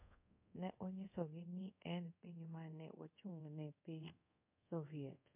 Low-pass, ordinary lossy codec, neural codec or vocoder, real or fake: 3.6 kHz; MP3, 32 kbps; codec, 24 kHz, 0.5 kbps, DualCodec; fake